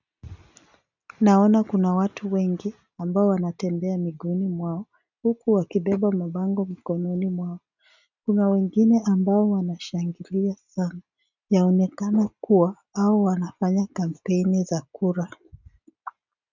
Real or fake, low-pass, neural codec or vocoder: real; 7.2 kHz; none